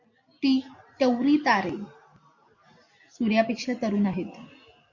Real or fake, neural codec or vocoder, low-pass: real; none; 7.2 kHz